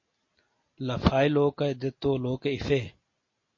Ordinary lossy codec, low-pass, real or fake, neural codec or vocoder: MP3, 32 kbps; 7.2 kHz; real; none